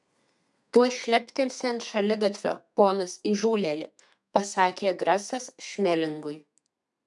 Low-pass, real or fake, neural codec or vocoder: 10.8 kHz; fake; codec, 32 kHz, 1.9 kbps, SNAC